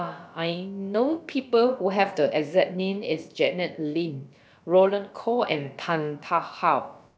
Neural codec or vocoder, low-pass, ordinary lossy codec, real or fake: codec, 16 kHz, about 1 kbps, DyCAST, with the encoder's durations; none; none; fake